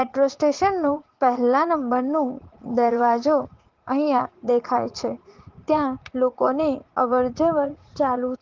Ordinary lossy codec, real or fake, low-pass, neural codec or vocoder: Opus, 16 kbps; fake; 7.2 kHz; vocoder, 44.1 kHz, 80 mel bands, Vocos